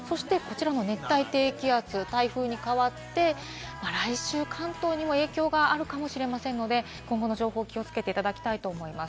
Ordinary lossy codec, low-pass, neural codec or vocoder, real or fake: none; none; none; real